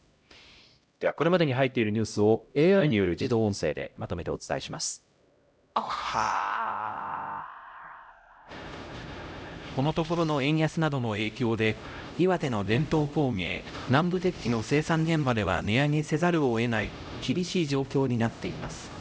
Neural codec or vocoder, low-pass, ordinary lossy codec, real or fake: codec, 16 kHz, 0.5 kbps, X-Codec, HuBERT features, trained on LibriSpeech; none; none; fake